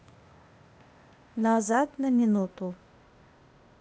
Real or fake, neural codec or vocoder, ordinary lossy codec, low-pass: fake; codec, 16 kHz, 0.8 kbps, ZipCodec; none; none